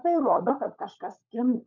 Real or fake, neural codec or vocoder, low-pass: fake; codec, 16 kHz, 16 kbps, FunCodec, trained on LibriTTS, 50 frames a second; 7.2 kHz